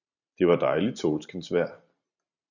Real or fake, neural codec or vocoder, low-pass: fake; vocoder, 44.1 kHz, 128 mel bands every 512 samples, BigVGAN v2; 7.2 kHz